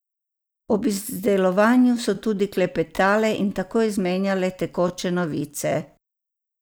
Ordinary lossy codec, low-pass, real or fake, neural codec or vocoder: none; none; real; none